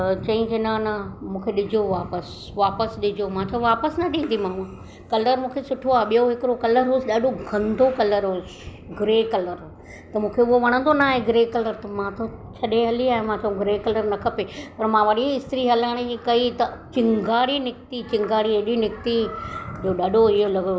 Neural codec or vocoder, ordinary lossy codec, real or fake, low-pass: none; none; real; none